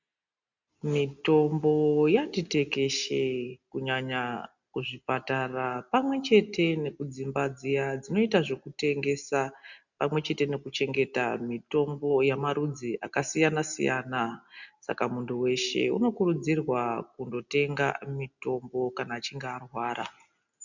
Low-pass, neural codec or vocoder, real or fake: 7.2 kHz; none; real